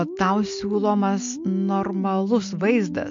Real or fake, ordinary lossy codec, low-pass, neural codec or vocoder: real; MP3, 48 kbps; 7.2 kHz; none